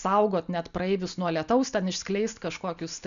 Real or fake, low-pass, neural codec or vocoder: real; 7.2 kHz; none